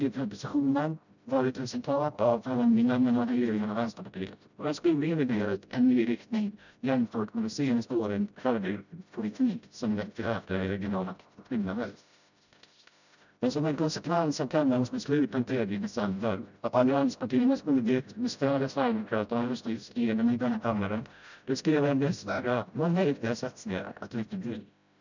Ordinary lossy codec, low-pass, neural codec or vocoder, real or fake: none; 7.2 kHz; codec, 16 kHz, 0.5 kbps, FreqCodec, smaller model; fake